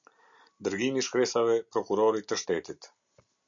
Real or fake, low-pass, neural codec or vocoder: real; 7.2 kHz; none